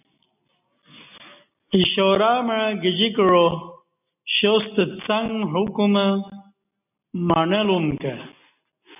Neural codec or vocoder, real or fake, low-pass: none; real; 3.6 kHz